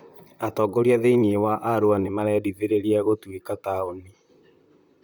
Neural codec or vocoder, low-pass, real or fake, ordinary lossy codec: vocoder, 44.1 kHz, 128 mel bands, Pupu-Vocoder; none; fake; none